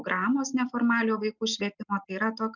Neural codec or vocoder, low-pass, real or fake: none; 7.2 kHz; real